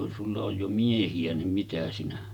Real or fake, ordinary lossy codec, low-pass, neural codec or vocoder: fake; none; 19.8 kHz; vocoder, 48 kHz, 128 mel bands, Vocos